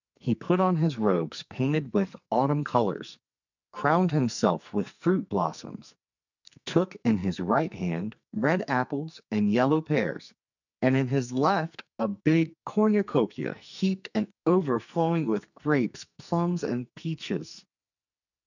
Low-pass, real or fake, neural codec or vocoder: 7.2 kHz; fake; codec, 44.1 kHz, 2.6 kbps, SNAC